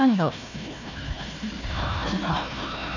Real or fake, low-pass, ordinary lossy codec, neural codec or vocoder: fake; 7.2 kHz; none; codec, 16 kHz, 1 kbps, FunCodec, trained on Chinese and English, 50 frames a second